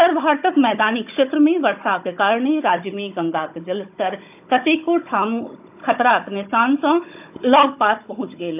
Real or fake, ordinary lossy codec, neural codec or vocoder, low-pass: fake; AAC, 32 kbps; codec, 16 kHz, 16 kbps, FunCodec, trained on Chinese and English, 50 frames a second; 3.6 kHz